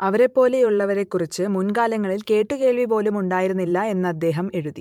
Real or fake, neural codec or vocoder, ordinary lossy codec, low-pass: fake; vocoder, 44.1 kHz, 128 mel bands every 512 samples, BigVGAN v2; MP3, 96 kbps; 19.8 kHz